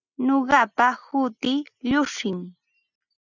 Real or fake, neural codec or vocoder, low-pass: real; none; 7.2 kHz